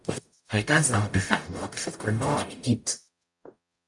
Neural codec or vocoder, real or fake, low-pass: codec, 44.1 kHz, 0.9 kbps, DAC; fake; 10.8 kHz